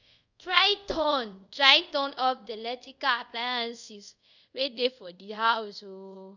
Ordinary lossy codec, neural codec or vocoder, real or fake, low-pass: none; codec, 24 kHz, 0.5 kbps, DualCodec; fake; 7.2 kHz